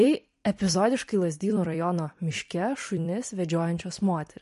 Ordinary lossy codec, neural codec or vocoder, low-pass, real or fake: MP3, 48 kbps; vocoder, 44.1 kHz, 128 mel bands every 256 samples, BigVGAN v2; 14.4 kHz; fake